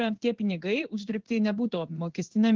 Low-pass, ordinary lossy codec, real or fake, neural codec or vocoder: 7.2 kHz; Opus, 16 kbps; fake; codec, 16 kHz in and 24 kHz out, 1 kbps, XY-Tokenizer